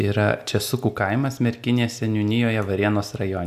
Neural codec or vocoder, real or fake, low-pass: none; real; 14.4 kHz